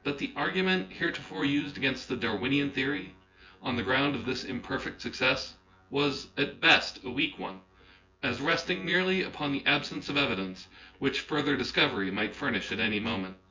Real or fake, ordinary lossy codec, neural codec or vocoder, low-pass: fake; MP3, 64 kbps; vocoder, 24 kHz, 100 mel bands, Vocos; 7.2 kHz